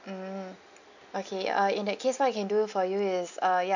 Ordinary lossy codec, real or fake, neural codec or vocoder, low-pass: none; real; none; 7.2 kHz